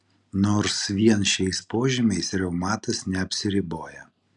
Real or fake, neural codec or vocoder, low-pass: real; none; 10.8 kHz